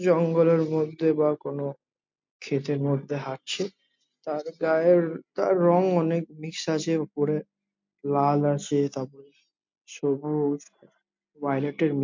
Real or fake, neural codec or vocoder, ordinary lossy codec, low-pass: real; none; MP3, 48 kbps; 7.2 kHz